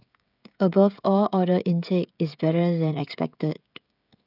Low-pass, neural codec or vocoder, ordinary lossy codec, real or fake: 5.4 kHz; codec, 16 kHz, 16 kbps, FreqCodec, smaller model; none; fake